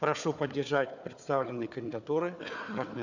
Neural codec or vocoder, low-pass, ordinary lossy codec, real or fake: codec, 16 kHz, 4 kbps, FunCodec, trained on Chinese and English, 50 frames a second; 7.2 kHz; none; fake